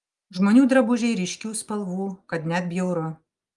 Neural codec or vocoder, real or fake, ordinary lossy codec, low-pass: none; real; Opus, 32 kbps; 10.8 kHz